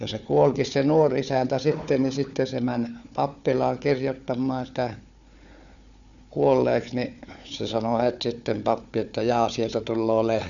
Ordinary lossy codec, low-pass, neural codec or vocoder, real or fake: none; 7.2 kHz; codec, 16 kHz, 4 kbps, FunCodec, trained on Chinese and English, 50 frames a second; fake